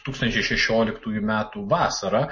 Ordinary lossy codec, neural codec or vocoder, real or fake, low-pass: MP3, 32 kbps; none; real; 7.2 kHz